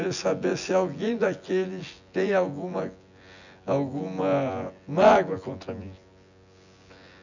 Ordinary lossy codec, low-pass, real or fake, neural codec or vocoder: none; 7.2 kHz; fake; vocoder, 24 kHz, 100 mel bands, Vocos